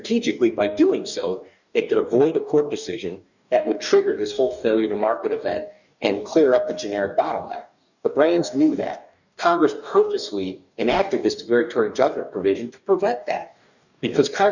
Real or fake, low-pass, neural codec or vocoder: fake; 7.2 kHz; codec, 44.1 kHz, 2.6 kbps, DAC